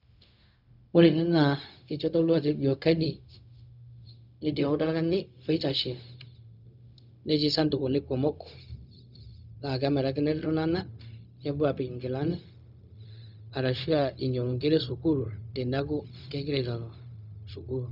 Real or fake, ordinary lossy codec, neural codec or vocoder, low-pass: fake; none; codec, 16 kHz, 0.4 kbps, LongCat-Audio-Codec; 5.4 kHz